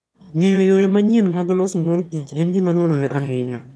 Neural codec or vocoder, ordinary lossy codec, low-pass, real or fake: autoencoder, 22.05 kHz, a latent of 192 numbers a frame, VITS, trained on one speaker; none; none; fake